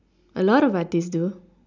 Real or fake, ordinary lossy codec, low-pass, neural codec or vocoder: real; none; 7.2 kHz; none